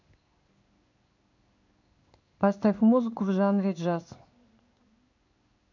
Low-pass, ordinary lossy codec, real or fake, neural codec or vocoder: 7.2 kHz; none; fake; codec, 16 kHz in and 24 kHz out, 1 kbps, XY-Tokenizer